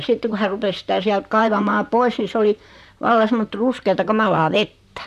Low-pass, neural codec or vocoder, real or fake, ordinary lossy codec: 14.4 kHz; vocoder, 44.1 kHz, 128 mel bands, Pupu-Vocoder; fake; none